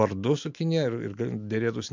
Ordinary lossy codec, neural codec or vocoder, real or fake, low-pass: MP3, 64 kbps; none; real; 7.2 kHz